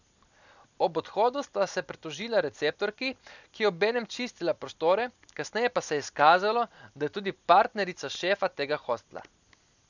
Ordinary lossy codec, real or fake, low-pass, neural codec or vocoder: none; real; 7.2 kHz; none